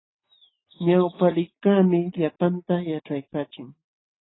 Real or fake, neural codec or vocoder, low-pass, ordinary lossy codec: real; none; 7.2 kHz; AAC, 16 kbps